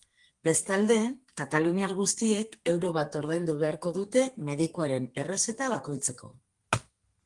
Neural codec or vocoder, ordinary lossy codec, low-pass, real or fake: codec, 32 kHz, 1.9 kbps, SNAC; Opus, 24 kbps; 10.8 kHz; fake